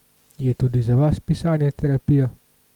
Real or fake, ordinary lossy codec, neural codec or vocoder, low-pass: real; Opus, 32 kbps; none; 19.8 kHz